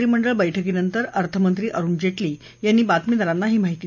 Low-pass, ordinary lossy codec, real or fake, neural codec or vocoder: 7.2 kHz; none; real; none